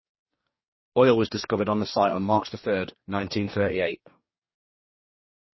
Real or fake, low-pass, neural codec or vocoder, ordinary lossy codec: fake; 7.2 kHz; codec, 44.1 kHz, 2.6 kbps, DAC; MP3, 24 kbps